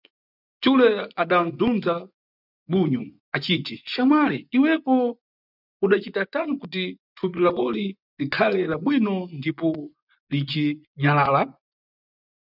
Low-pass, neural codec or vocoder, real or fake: 5.4 kHz; vocoder, 24 kHz, 100 mel bands, Vocos; fake